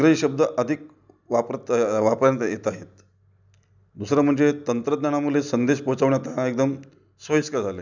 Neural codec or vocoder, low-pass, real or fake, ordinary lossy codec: none; 7.2 kHz; real; none